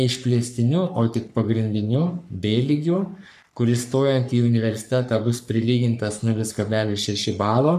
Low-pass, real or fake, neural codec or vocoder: 14.4 kHz; fake; codec, 44.1 kHz, 3.4 kbps, Pupu-Codec